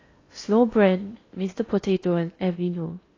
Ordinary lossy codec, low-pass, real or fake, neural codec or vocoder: AAC, 32 kbps; 7.2 kHz; fake; codec, 16 kHz in and 24 kHz out, 0.8 kbps, FocalCodec, streaming, 65536 codes